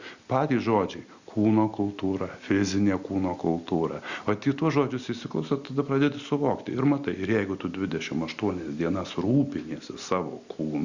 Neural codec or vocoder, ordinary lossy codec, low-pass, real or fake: none; Opus, 64 kbps; 7.2 kHz; real